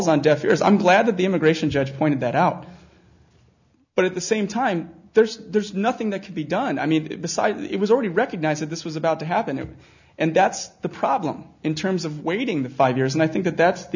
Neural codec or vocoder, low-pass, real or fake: none; 7.2 kHz; real